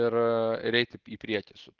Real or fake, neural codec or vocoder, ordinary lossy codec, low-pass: real; none; Opus, 16 kbps; 7.2 kHz